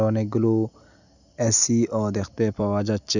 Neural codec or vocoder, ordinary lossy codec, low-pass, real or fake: none; none; 7.2 kHz; real